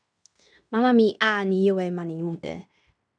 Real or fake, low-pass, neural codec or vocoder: fake; 9.9 kHz; codec, 16 kHz in and 24 kHz out, 0.9 kbps, LongCat-Audio-Codec, fine tuned four codebook decoder